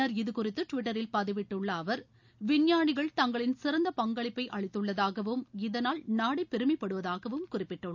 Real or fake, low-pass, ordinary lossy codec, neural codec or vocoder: real; 7.2 kHz; none; none